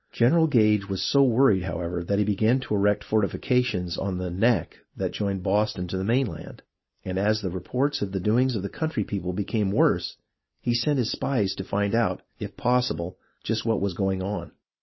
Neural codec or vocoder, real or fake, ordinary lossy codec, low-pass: none; real; MP3, 24 kbps; 7.2 kHz